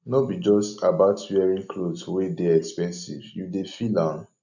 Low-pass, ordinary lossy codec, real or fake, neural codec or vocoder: 7.2 kHz; none; real; none